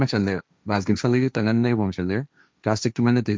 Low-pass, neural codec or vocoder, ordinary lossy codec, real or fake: none; codec, 16 kHz, 1.1 kbps, Voila-Tokenizer; none; fake